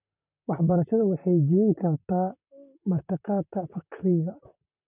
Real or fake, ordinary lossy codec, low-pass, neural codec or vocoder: fake; none; 3.6 kHz; vocoder, 44.1 kHz, 128 mel bands, Pupu-Vocoder